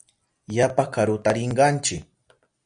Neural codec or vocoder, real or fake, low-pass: none; real; 9.9 kHz